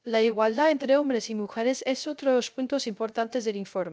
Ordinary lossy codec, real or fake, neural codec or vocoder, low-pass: none; fake; codec, 16 kHz, 0.3 kbps, FocalCodec; none